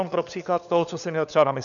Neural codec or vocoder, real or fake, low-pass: codec, 16 kHz, 4 kbps, FunCodec, trained on LibriTTS, 50 frames a second; fake; 7.2 kHz